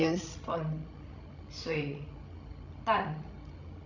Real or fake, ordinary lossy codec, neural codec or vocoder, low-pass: fake; none; codec, 16 kHz, 16 kbps, FreqCodec, larger model; 7.2 kHz